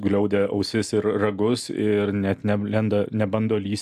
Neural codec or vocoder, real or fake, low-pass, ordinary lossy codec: none; real; 14.4 kHz; AAC, 96 kbps